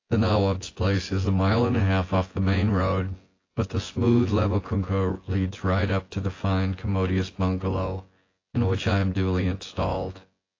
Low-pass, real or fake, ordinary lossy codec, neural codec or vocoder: 7.2 kHz; fake; AAC, 32 kbps; vocoder, 24 kHz, 100 mel bands, Vocos